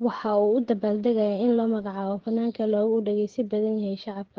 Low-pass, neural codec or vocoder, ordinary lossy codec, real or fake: 7.2 kHz; codec, 16 kHz, 8 kbps, FreqCodec, smaller model; Opus, 16 kbps; fake